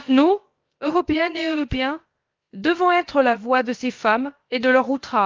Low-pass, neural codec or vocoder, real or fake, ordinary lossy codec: 7.2 kHz; codec, 16 kHz, about 1 kbps, DyCAST, with the encoder's durations; fake; Opus, 32 kbps